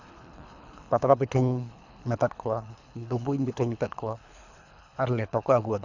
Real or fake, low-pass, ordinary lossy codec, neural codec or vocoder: fake; 7.2 kHz; none; codec, 24 kHz, 3 kbps, HILCodec